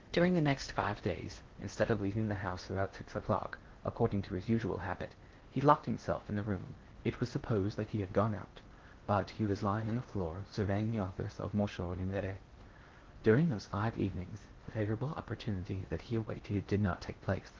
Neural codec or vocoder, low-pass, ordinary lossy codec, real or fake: codec, 16 kHz in and 24 kHz out, 0.6 kbps, FocalCodec, streaming, 4096 codes; 7.2 kHz; Opus, 16 kbps; fake